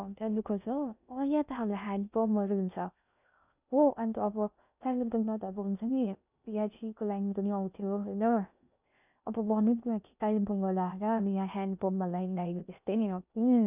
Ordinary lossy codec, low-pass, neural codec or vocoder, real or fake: none; 3.6 kHz; codec, 16 kHz in and 24 kHz out, 0.6 kbps, FocalCodec, streaming, 2048 codes; fake